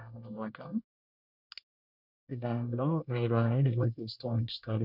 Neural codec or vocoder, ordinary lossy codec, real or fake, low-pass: codec, 24 kHz, 1 kbps, SNAC; none; fake; 5.4 kHz